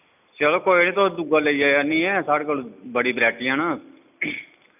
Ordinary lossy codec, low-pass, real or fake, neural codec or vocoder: none; 3.6 kHz; real; none